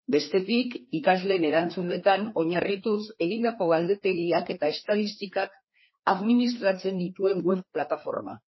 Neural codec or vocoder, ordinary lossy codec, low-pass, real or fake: codec, 16 kHz, 2 kbps, FreqCodec, larger model; MP3, 24 kbps; 7.2 kHz; fake